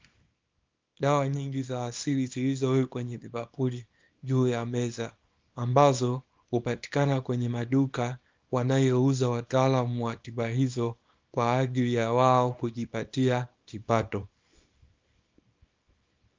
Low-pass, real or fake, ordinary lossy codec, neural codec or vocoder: 7.2 kHz; fake; Opus, 24 kbps; codec, 24 kHz, 0.9 kbps, WavTokenizer, small release